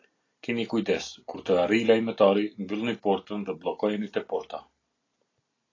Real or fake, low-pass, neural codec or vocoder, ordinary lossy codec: real; 7.2 kHz; none; AAC, 32 kbps